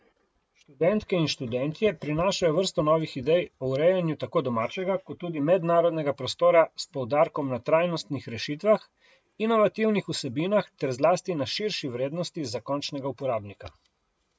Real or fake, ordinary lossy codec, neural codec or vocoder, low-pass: real; none; none; none